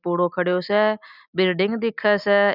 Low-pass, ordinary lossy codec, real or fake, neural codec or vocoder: 5.4 kHz; none; real; none